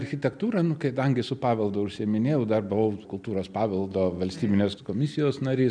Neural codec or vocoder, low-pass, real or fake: none; 9.9 kHz; real